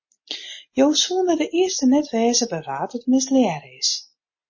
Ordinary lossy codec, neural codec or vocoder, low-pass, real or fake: MP3, 32 kbps; none; 7.2 kHz; real